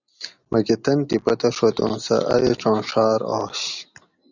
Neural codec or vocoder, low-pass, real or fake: none; 7.2 kHz; real